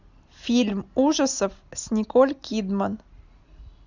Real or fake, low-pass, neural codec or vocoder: real; 7.2 kHz; none